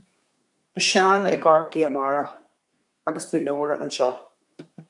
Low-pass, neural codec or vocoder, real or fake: 10.8 kHz; codec, 24 kHz, 1 kbps, SNAC; fake